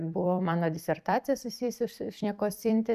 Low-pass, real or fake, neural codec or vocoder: 14.4 kHz; fake; vocoder, 44.1 kHz, 128 mel bands every 256 samples, BigVGAN v2